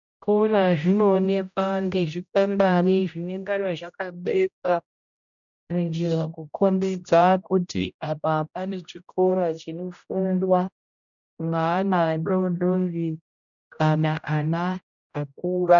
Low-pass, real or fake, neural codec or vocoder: 7.2 kHz; fake; codec, 16 kHz, 0.5 kbps, X-Codec, HuBERT features, trained on general audio